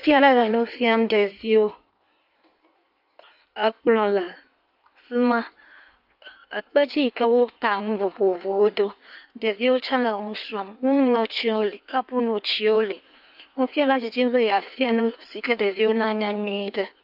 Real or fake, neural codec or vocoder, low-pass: fake; codec, 16 kHz in and 24 kHz out, 1.1 kbps, FireRedTTS-2 codec; 5.4 kHz